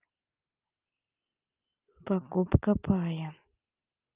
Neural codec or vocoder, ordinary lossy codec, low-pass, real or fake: none; Opus, 24 kbps; 3.6 kHz; real